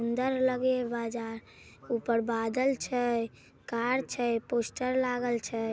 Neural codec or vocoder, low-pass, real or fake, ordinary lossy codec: none; none; real; none